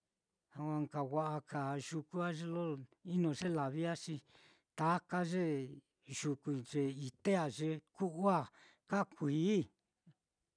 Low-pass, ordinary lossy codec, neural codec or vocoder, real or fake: 9.9 kHz; none; none; real